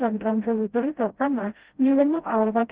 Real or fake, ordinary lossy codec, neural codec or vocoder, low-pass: fake; Opus, 16 kbps; codec, 16 kHz, 0.5 kbps, FreqCodec, smaller model; 3.6 kHz